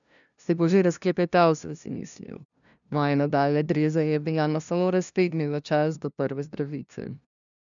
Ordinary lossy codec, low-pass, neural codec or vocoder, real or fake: none; 7.2 kHz; codec, 16 kHz, 0.5 kbps, FunCodec, trained on LibriTTS, 25 frames a second; fake